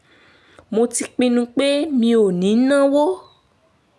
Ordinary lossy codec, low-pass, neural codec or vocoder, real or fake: none; none; none; real